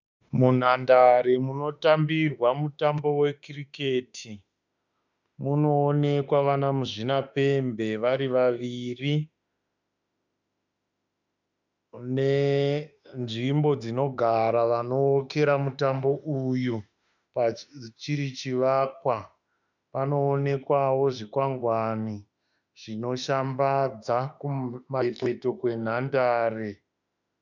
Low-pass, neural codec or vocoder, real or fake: 7.2 kHz; autoencoder, 48 kHz, 32 numbers a frame, DAC-VAE, trained on Japanese speech; fake